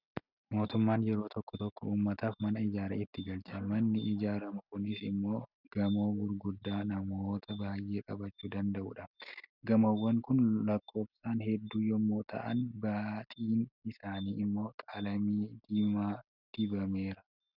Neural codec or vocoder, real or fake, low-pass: none; real; 5.4 kHz